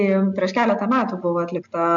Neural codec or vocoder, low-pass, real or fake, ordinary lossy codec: none; 7.2 kHz; real; MP3, 64 kbps